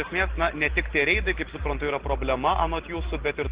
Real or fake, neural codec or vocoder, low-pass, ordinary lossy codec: real; none; 3.6 kHz; Opus, 16 kbps